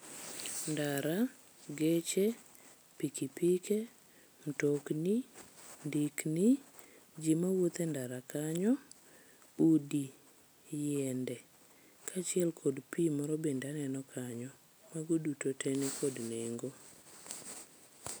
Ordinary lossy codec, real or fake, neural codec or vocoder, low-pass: none; real; none; none